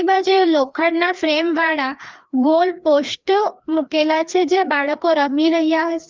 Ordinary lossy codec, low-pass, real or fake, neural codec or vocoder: Opus, 24 kbps; 7.2 kHz; fake; codec, 16 kHz, 2 kbps, FreqCodec, larger model